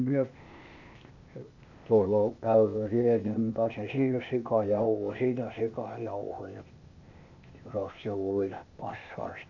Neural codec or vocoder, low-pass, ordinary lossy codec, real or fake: codec, 16 kHz, 0.8 kbps, ZipCodec; 7.2 kHz; none; fake